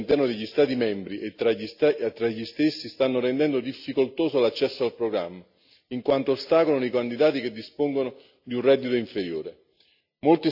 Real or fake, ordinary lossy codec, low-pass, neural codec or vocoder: real; AAC, 48 kbps; 5.4 kHz; none